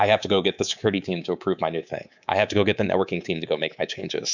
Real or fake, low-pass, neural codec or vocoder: fake; 7.2 kHz; codec, 24 kHz, 3.1 kbps, DualCodec